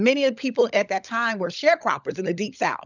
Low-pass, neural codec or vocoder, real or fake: 7.2 kHz; codec, 16 kHz, 16 kbps, FunCodec, trained on LibriTTS, 50 frames a second; fake